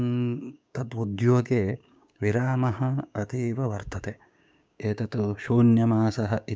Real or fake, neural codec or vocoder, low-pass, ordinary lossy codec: fake; codec, 16 kHz, 6 kbps, DAC; none; none